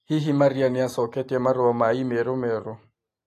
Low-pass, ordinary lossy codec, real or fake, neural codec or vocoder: 14.4 kHz; AAC, 48 kbps; real; none